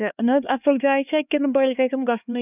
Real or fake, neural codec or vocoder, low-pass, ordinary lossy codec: fake; codec, 16 kHz, 2 kbps, X-Codec, HuBERT features, trained on LibriSpeech; 3.6 kHz; none